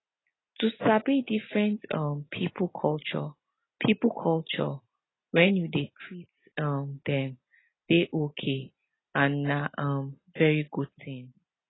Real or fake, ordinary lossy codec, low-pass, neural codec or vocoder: real; AAC, 16 kbps; 7.2 kHz; none